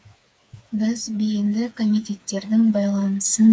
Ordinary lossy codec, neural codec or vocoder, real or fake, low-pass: none; codec, 16 kHz, 4 kbps, FreqCodec, smaller model; fake; none